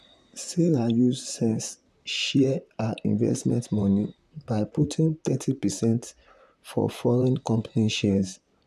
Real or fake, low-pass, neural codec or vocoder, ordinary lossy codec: fake; 14.4 kHz; vocoder, 44.1 kHz, 128 mel bands, Pupu-Vocoder; none